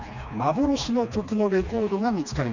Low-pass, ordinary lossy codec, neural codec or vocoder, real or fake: 7.2 kHz; none; codec, 16 kHz, 2 kbps, FreqCodec, smaller model; fake